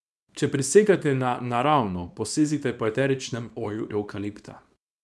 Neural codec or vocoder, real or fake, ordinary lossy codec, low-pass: codec, 24 kHz, 0.9 kbps, WavTokenizer, small release; fake; none; none